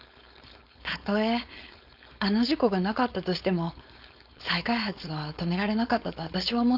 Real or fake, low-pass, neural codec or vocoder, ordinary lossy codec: fake; 5.4 kHz; codec, 16 kHz, 4.8 kbps, FACodec; none